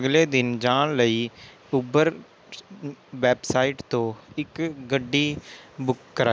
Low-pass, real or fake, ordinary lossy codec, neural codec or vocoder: none; real; none; none